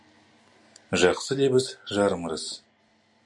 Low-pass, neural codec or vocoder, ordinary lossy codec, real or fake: 10.8 kHz; none; MP3, 64 kbps; real